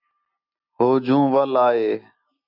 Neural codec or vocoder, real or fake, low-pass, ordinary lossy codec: none; real; 5.4 kHz; AAC, 48 kbps